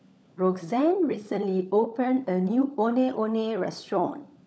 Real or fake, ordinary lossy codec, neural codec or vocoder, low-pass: fake; none; codec, 16 kHz, 16 kbps, FunCodec, trained on LibriTTS, 50 frames a second; none